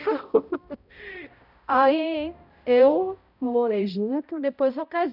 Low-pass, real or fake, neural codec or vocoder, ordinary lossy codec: 5.4 kHz; fake; codec, 16 kHz, 0.5 kbps, X-Codec, HuBERT features, trained on balanced general audio; none